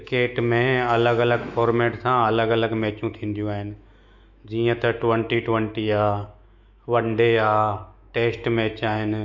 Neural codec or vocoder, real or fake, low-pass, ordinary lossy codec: autoencoder, 48 kHz, 128 numbers a frame, DAC-VAE, trained on Japanese speech; fake; 7.2 kHz; MP3, 64 kbps